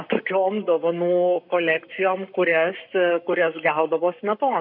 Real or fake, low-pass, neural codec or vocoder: fake; 5.4 kHz; codec, 44.1 kHz, 7.8 kbps, Pupu-Codec